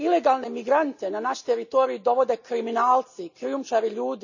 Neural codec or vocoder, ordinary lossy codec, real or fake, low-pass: none; none; real; 7.2 kHz